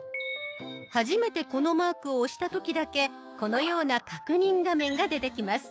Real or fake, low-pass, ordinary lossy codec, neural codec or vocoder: fake; none; none; codec, 16 kHz, 6 kbps, DAC